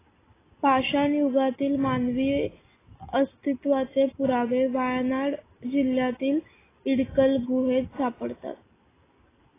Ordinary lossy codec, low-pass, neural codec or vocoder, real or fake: AAC, 16 kbps; 3.6 kHz; none; real